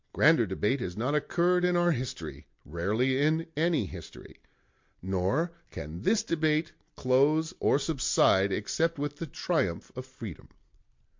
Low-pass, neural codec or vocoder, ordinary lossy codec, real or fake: 7.2 kHz; none; MP3, 48 kbps; real